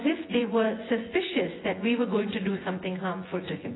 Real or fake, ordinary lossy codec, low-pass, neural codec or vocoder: fake; AAC, 16 kbps; 7.2 kHz; vocoder, 24 kHz, 100 mel bands, Vocos